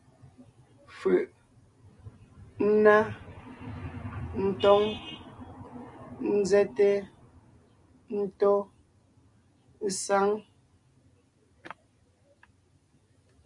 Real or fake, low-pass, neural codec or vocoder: real; 10.8 kHz; none